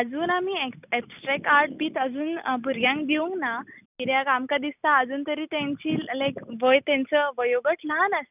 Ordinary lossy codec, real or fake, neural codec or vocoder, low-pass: none; real; none; 3.6 kHz